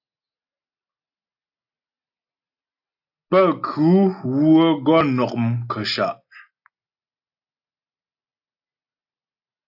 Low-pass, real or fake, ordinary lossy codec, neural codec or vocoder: 5.4 kHz; real; AAC, 48 kbps; none